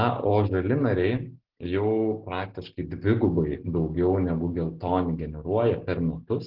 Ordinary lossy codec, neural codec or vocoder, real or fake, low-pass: Opus, 16 kbps; none; real; 5.4 kHz